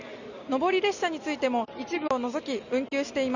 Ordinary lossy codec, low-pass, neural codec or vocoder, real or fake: none; 7.2 kHz; none; real